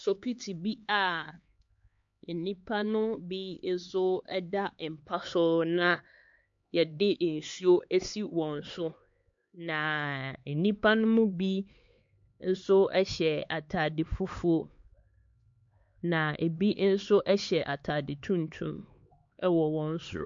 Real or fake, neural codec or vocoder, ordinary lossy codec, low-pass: fake; codec, 16 kHz, 4 kbps, X-Codec, HuBERT features, trained on LibriSpeech; MP3, 48 kbps; 7.2 kHz